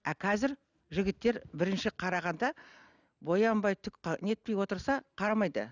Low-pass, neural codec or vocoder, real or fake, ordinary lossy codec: 7.2 kHz; none; real; none